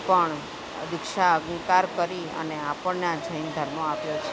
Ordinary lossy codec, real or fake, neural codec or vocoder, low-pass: none; real; none; none